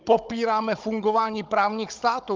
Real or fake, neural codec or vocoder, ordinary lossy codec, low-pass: fake; codec, 16 kHz, 16 kbps, FunCodec, trained on Chinese and English, 50 frames a second; Opus, 32 kbps; 7.2 kHz